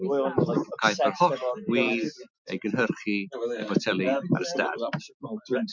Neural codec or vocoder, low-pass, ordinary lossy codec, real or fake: none; 7.2 kHz; MP3, 64 kbps; real